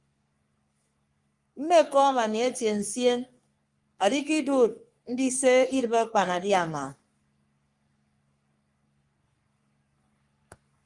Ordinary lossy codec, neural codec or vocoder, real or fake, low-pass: Opus, 32 kbps; codec, 44.1 kHz, 3.4 kbps, Pupu-Codec; fake; 10.8 kHz